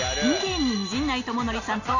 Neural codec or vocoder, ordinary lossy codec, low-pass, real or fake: none; none; 7.2 kHz; real